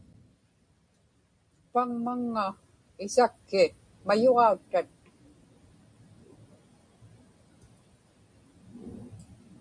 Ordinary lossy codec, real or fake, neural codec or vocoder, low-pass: MP3, 48 kbps; real; none; 9.9 kHz